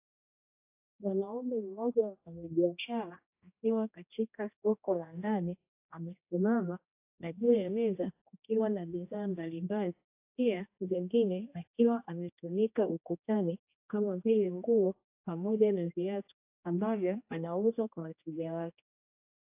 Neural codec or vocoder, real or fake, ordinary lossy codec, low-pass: codec, 16 kHz, 1 kbps, X-Codec, HuBERT features, trained on general audio; fake; AAC, 32 kbps; 3.6 kHz